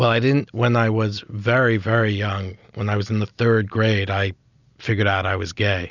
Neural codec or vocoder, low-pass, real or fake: none; 7.2 kHz; real